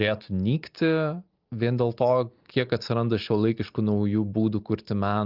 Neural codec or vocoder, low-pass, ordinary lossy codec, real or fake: none; 5.4 kHz; Opus, 24 kbps; real